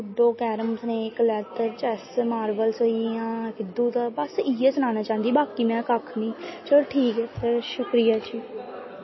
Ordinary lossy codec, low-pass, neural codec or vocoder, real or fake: MP3, 24 kbps; 7.2 kHz; none; real